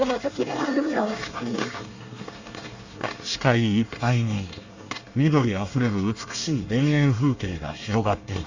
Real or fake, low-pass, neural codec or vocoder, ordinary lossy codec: fake; 7.2 kHz; codec, 24 kHz, 1 kbps, SNAC; Opus, 64 kbps